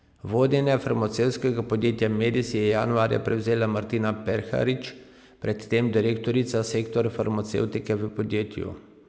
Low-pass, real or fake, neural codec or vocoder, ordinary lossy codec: none; real; none; none